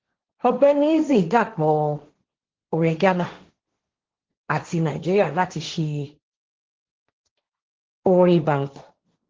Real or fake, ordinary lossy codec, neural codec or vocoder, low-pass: fake; Opus, 16 kbps; codec, 16 kHz, 1.1 kbps, Voila-Tokenizer; 7.2 kHz